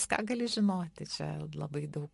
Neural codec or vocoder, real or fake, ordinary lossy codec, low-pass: none; real; MP3, 48 kbps; 14.4 kHz